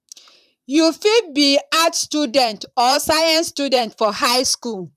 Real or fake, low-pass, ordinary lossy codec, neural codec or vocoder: fake; 14.4 kHz; none; vocoder, 44.1 kHz, 128 mel bands, Pupu-Vocoder